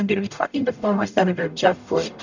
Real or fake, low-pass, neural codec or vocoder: fake; 7.2 kHz; codec, 44.1 kHz, 0.9 kbps, DAC